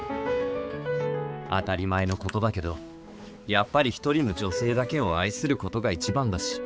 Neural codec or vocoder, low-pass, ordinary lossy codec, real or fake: codec, 16 kHz, 4 kbps, X-Codec, HuBERT features, trained on balanced general audio; none; none; fake